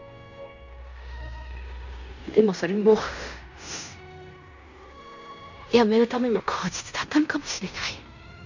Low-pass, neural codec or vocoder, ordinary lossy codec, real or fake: 7.2 kHz; codec, 16 kHz in and 24 kHz out, 0.9 kbps, LongCat-Audio-Codec, fine tuned four codebook decoder; none; fake